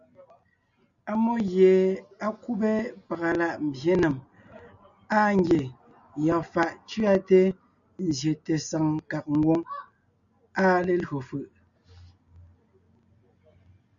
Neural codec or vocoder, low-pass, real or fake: none; 7.2 kHz; real